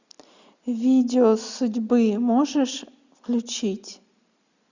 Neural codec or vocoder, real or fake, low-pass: none; real; 7.2 kHz